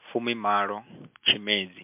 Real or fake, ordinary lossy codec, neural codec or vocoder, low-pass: real; MP3, 32 kbps; none; 3.6 kHz